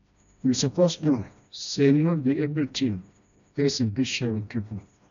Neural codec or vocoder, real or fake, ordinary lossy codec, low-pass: codec, 16 kHz, 1 kbps, FreqCodec, smaller model; fake; none; 7.2 kHz